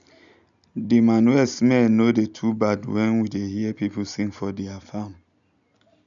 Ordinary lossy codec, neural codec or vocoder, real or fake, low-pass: none; none; real; 7.2 kHz